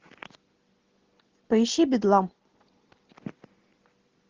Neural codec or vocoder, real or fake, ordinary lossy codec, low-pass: none; real; Opus, 16 kbps; 7.2 kHz